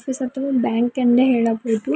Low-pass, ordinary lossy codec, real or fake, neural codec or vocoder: none; none; real; none